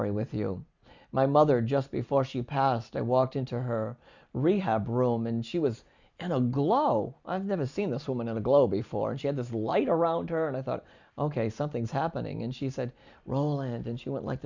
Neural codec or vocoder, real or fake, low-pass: none; real; 7.2 kHz